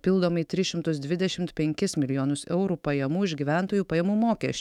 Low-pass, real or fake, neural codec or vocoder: 19.8 kHz; fake; autoencoder, 48 kHz, 128 numbers a frame, DAC-VAE, trained on Japanese speech